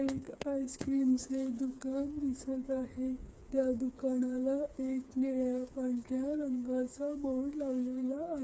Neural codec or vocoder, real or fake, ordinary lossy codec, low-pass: codec, 16 kHz, 4 kbps, FreqCodec, smaller model; fake; none; none